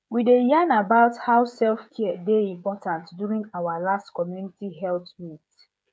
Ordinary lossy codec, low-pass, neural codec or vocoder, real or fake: none; none; codec, 16 kHz, 16 kbps, FreqCodec, smaller model; fake